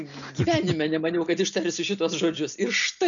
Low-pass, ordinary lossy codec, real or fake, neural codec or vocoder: 7.2 kHz; MP3, 96 kbps; real; none